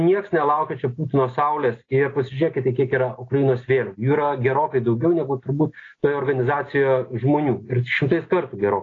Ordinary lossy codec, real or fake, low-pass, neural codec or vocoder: AAC, 32 kbps; real; 7.2 kHz; none